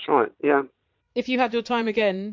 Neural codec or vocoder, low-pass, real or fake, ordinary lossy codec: vocoder, 22.05 kHz, 80 mel bands, Vocos; 7.2 kHz; fake; MP3, 48 kbps